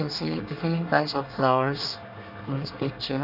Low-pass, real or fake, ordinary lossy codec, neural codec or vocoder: 5.4 kHz; fake; none; codec, 24 kHz, 1 kbps, SNAC